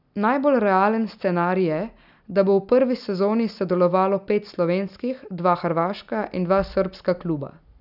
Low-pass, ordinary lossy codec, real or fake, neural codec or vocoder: 5.4 kHz; none; real; none